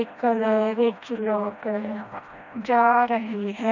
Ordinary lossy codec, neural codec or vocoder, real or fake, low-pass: none; codec, 16 kHz, 1 kbps, FreqCodec, smaller model; fake; 7.2 kHz